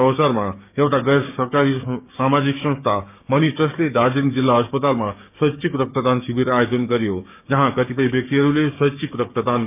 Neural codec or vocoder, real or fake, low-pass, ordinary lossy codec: codec, 16 kHz, 6 kbps, DAC; fake; 3.6 kHz; none